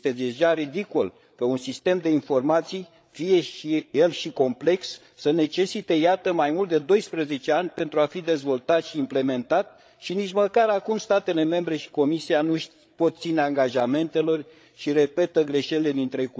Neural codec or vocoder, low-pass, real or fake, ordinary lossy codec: codec, 16 kHz, 8 kbps, FreqCodec, larger model; none; fake; none